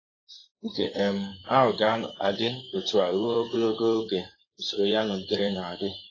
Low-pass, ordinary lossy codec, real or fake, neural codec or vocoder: 7.2 kHz; AAC, 32 kbps; fake; codec, 16 kHz in and 24 kHz out, 2.2 kbps, FireRedTTS-2 codec